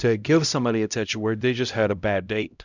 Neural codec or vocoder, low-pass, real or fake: codec, 16 kHz, 0.5 kbps, X-Codec, HuBERT features, trained on LibriSpeech; 7.2 kHz; fake